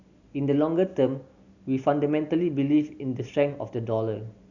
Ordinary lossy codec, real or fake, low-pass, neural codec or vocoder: none; real; 7.2 kHz; none